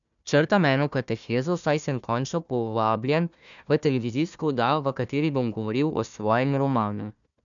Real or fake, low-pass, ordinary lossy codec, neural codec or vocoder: fake; 7.2 kHz; none; codec, 16 kHz, 1 kbps, FunCodec, trained on Chinese and English, 50 frames a second